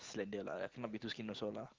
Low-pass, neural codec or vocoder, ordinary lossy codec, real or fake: 7.2 kHz; none; Opus, 16 kbps; real